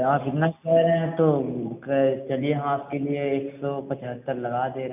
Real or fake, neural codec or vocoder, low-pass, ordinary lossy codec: real; none; 3.6 kHz; none